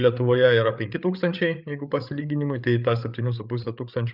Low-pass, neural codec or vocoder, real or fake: 5.4 kHz; codec, 16 kHz, 8 kbps, FreqCodec, larger model; fake